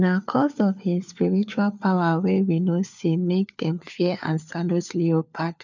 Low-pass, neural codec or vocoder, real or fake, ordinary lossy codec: 7.2 kHz; codec, 16 kHz, 4 kbps, FunCodec, trained on LibriTTS, 50 frames a second; fake; none